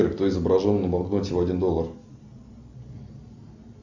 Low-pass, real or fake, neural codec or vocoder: 7.2 kHz; real; none